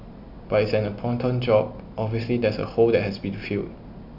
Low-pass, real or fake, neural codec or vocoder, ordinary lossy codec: 5.4 kHz; real; none; none